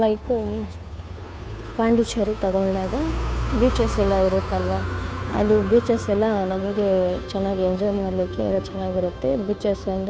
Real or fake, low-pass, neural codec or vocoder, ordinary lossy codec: fake; none; codec, 16 kHz, 2 kbps, FunCodec, trained on Chinese and English, 25 frames a second; none